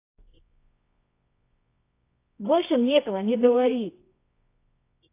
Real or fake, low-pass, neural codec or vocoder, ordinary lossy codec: fake; 3.6 kHz; codec, 24 kHz, 0.9 kbps, WavTokenizer, medium music audio release; AAC, 24 kbps